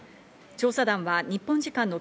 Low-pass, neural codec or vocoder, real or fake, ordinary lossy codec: none; none; real; none